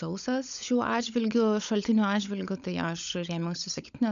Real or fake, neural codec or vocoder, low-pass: fake; codec, 16 kHz, 16 kbps, FunCodec, trained on LibriTTS, 50 frames a second; 7.2 kHz